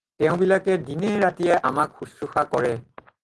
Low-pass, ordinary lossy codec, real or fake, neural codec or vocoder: 10.8 kHz; Opus, 16 kbps; real; none